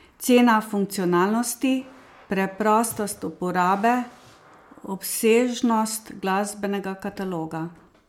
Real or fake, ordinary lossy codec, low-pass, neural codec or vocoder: real; MP3, 96 kbps; 19.8 kHz; none